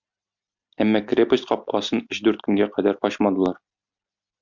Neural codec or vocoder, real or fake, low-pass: none; real; 7.2 kHz